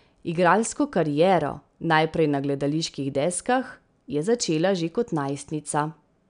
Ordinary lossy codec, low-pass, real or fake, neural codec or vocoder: none; 9.9 kHz; real; none